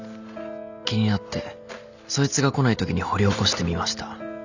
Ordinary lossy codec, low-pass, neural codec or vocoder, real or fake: none; 7.2 kHz; none; real